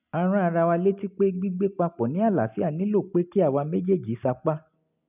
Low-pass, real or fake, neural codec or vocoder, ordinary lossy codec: 3.6 kHz; real; none; none